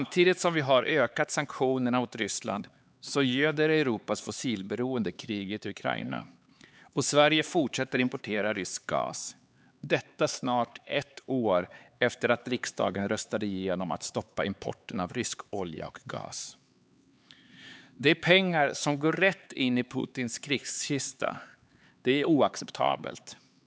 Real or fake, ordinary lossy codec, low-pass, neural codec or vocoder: fake; none; none; codec, 16 kHz, 4 kbps, X-Codec, HuBERT features, trained on LibriSpeech